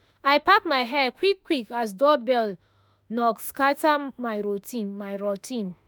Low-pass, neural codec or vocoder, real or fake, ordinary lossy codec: none; autoencoder, 48 kHz, 32 numbers a frame, DAC-VAE, trained on Japanese speech; fake; none